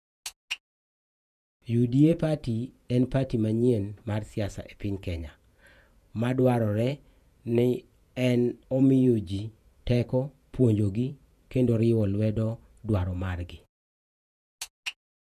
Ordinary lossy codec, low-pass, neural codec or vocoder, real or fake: none; 14.4 kHz; vocoder, 48 kHz, 128 mel bands, Vocos; fake